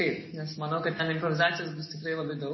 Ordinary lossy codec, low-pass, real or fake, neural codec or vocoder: MP3, 24 kbps; 7.2 kHz; real; none